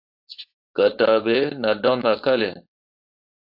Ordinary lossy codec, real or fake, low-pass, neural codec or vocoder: AAC, 48 kbps; fake; 5.4 kHz; codec, 16 kHz, 4.8 kbps, FACodec